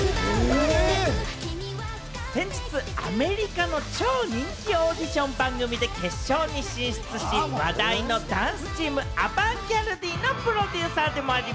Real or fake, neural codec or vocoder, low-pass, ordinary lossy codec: real; none; none; none